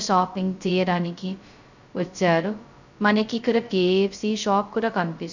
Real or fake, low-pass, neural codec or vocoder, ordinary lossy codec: fake; 7.2 kHz; codec, 16 kHz, 0.2 kbps, FocalCodec; none